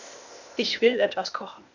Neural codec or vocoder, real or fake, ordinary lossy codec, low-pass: codec, 16 kHz, 0.8 kbps, ZipCodec; fake; none; 7.2 kHz